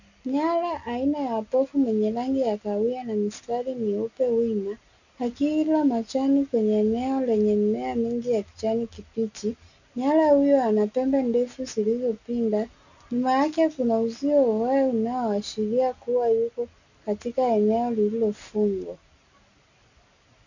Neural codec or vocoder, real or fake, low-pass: none; real; 7.2 kHz